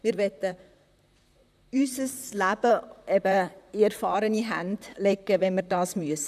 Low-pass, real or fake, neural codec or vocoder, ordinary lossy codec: 14.4 kHz; fake; vocoder, 44.1 kHz, 128 mel bands, Pupu-Vocoder; none